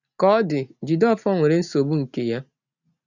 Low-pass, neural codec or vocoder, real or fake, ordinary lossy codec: 7.2 kHz; none; real; none